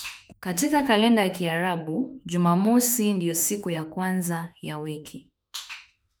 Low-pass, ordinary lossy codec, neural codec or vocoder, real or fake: none; none; autoencoder, 48 kHz, 32 numbers a frame, DAC-VAE, trained on Japanese speech; fake